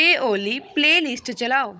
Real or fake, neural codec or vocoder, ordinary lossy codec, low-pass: fake; codec, 16 kHz, 16 kbps, FunCodec, trained on Chinese and English, 50 frames a second; none; none